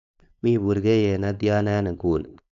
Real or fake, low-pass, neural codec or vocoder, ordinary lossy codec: fake; 7.2 kHz; codec, 16 kHz, 4.8 kbps, FACodec; none